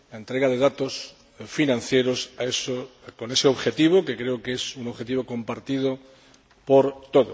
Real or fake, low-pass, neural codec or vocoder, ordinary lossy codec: real; none; none; none